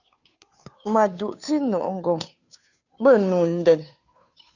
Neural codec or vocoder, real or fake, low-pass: codec, 16 kHz, 2 kbps, FunCodec, trained on Chinese and English, 25 frames a second; fake; 7.2 kHz